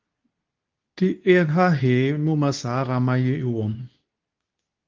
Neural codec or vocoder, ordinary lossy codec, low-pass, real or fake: codec, 24 kHz, 0.9 kbps, WavTokenizer, medium speech release version 2; Opus, 24 kbps; 7.2 kHz; fake